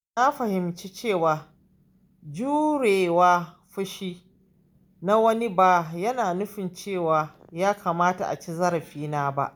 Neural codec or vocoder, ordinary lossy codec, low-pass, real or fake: none; none; none; real